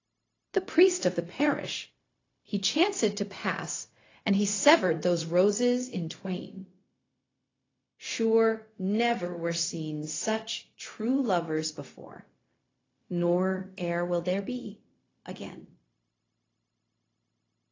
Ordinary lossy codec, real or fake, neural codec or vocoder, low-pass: AAC, 32 kbps; fake; codec, 16 kHz, 0.4 kbps, LongCat-Audio-Codec; 7.2 kHz